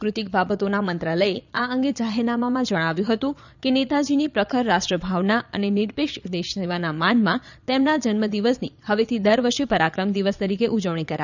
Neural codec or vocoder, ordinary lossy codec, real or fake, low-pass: vocoder, 22.05 kHz, 80 mel bands, Vocos; none; fake; 7.2 kHz